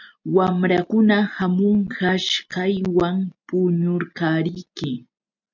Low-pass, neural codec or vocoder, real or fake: 7.2 kHz; none; real